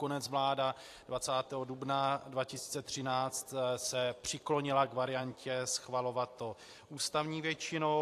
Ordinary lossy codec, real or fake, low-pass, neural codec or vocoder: AAC, 64 kbps; fake; 14.4 kHz; vocoder, 44.1 kHz, 128 mel bands every 256 samples, BigVGAN v2